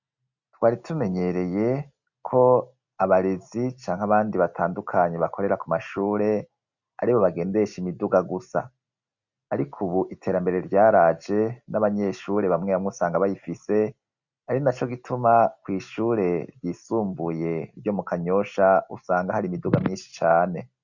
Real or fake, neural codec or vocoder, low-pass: real; none; 7.2 kHz